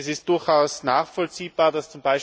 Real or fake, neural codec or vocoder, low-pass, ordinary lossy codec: real; none; none; none